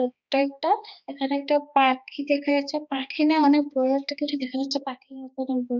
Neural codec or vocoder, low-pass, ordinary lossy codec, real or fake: codec, 16 kHz, 2 kbps, X-Codec, HuBERT features, trained on balanced general audio; 7.2 kHz; Opus, 64 kbps; fake